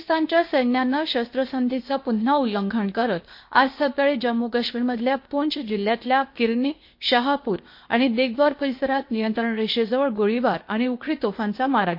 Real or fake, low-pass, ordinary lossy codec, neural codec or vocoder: fake; 5.4 kHz; MP3, 32 kbps; codec, 16 kHz, 0.8 kbps, ZipCodec